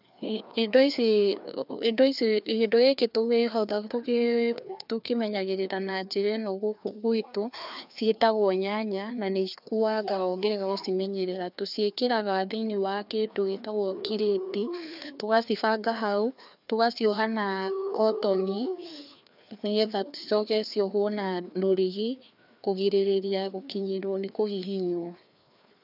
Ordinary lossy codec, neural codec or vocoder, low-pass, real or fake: none; codec, 16 kHz, 2 kbps, FreqCodec, larger model; 5.4 kHz; fake